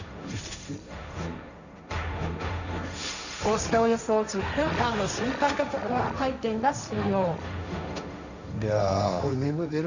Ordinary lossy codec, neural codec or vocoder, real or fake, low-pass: none; codec, 16 kHz, 1.1 kbps, Voila-Tokenizer; fake; 7.2 kHz